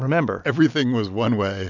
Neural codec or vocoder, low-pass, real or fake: none; 7.2 kHz; real